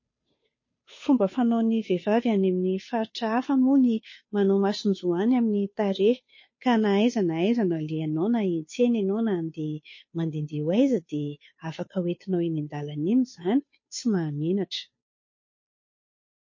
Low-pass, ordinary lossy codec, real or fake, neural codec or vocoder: 7.2 kHz; MP3, 32 kbps; fake; codec, 16 kHz, 2 kbps, FunCodec, trained on Chinese and English, 25 frames a second